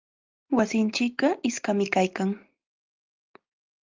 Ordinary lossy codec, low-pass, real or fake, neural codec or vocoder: Opus, 24 kbps; 7.2 kHz; real; none